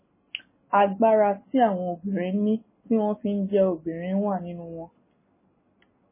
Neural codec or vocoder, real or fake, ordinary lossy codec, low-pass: none; real; MP3, 16 kbps; 3.6 kHz